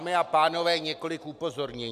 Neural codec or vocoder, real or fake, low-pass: none; real; 14.4 kHz